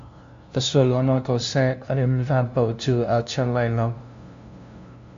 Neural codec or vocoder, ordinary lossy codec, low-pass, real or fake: codec, 16 kHz, 0.5 kbps, FunCodec, trained on LibriTTS, 25 frames a second; MP3, 48 kbps; 7.2 kHz; fake